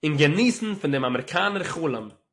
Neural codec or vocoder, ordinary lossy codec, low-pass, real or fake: none; AAC, 48 kbps; 10.8 kHz; real